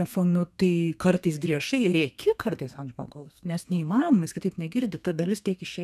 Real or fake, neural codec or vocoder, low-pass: fake; codec, 32 kHz, 1.9 kbps, SNAC; 14.4 kHz